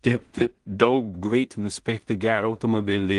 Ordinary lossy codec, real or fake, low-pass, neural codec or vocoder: Opus, 24 kbps; fake; 10.8 kHz; codec, 16 kHz in and 24 kHz out, 0.4 kbps, LongCat-Audio-Codec, two codebook decoder